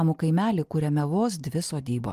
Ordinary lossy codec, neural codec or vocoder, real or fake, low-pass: Opus, 32 kbps; none; real; 14.4 kHz